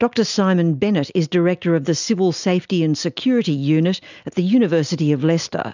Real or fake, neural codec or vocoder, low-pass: real; none; 7.2 kHz